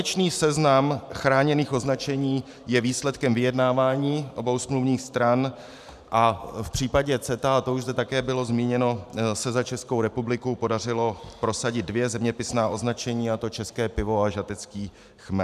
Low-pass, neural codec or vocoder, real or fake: 14.4 kHz; vocoder, 44.1 kHz, 128 mel bands every 512 samples, BigVGAN v2; fake